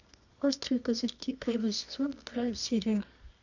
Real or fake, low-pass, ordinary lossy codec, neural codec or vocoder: fake; 7.2 kHz; none; codec, 24 kHz, 0.9 kbps, WavTokenizer, medium music audio release